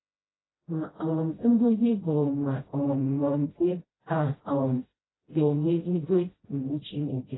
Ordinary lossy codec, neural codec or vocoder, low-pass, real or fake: AAC, 16 kbps; codec, 16 kHz, 0.5 kbps, FreqCodec, smaller model; 7.2 kHz; fake